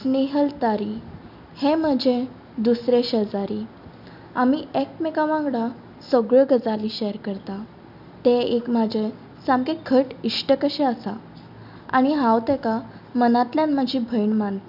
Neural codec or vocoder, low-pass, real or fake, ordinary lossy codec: none; 5.4 kHz; real; none